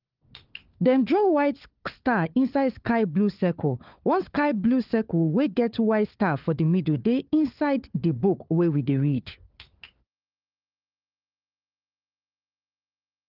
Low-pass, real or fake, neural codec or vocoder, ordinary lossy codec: 5.4 kHz; fake; codec, 16 kHz, 4 kbps, FunCodec, trained on LibriTTS, 50 frames a second; Opus, 32 kbps